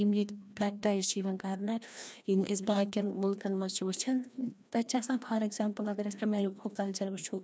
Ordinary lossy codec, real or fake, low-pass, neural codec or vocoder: none; fake; none; codec, 16 kHz, 1 kbps, FreqCodec, larger model